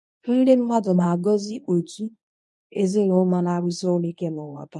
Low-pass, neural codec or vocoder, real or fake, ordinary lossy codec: 10.8 kHz; codec, 24 kHz, 0.9 kbps, WavTokenizer, medium speech release version 1; fake; none